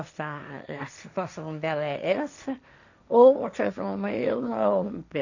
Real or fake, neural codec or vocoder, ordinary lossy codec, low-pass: fake; codec, 16 kHz, 1.1 kbps, Voila-Tokenizer; none; 7.2 kHz